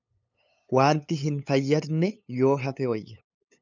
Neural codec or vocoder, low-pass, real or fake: codec, 16 kHz, 8 kbps, FunCodec, trained on LibriTTS, 25 frames a second; 7.2 kHz; fake